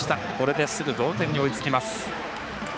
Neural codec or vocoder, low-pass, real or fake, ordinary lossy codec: codec, 16 kHz, 4 kbps, X-Codec, HuBERT features, trained on balanced general audio; none; fake; none